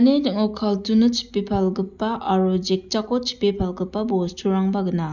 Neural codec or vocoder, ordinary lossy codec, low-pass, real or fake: none; none; 7.2 kHz; real